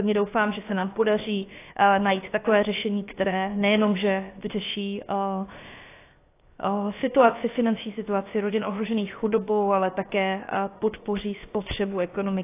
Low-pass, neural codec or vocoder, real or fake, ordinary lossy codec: 3.6 kHz; codec, 16 kHz, 0.7 kbps, FocalCodec; fake; AAC, 24 kbps